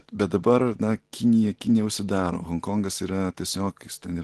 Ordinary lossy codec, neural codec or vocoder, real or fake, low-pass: Opus, 16 kbps; none; real; 10.8 kHz